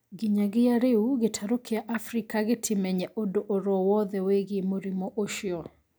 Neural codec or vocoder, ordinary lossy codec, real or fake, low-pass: none; none; real; none